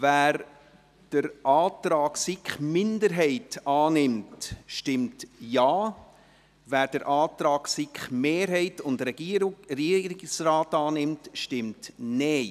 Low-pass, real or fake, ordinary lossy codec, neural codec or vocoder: 14.4 kHz; real; none; none